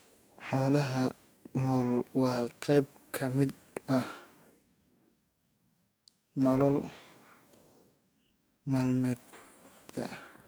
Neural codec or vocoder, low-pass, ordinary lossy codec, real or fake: codec, 44.1 kHz, 2.6 kbps, DAC; none; none; fake